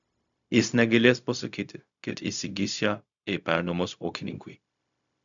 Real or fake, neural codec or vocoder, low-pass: fake; codec, 16 kHz, 0.4 kbps, LongCat-Audio-Codec; 7.2 kHz